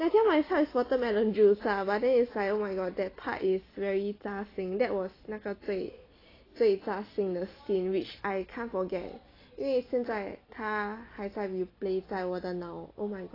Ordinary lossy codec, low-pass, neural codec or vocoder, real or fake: AAC, 24 kbps; 5.4 kHz; none; real